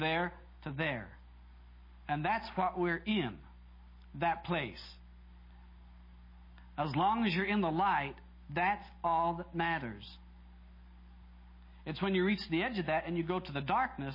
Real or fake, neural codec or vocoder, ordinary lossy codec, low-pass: real; none; MP3, 24 kbps; 5.4 kHz